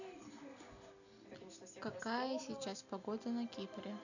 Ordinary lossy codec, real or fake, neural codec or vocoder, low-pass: none; real; none; 7.2 kHz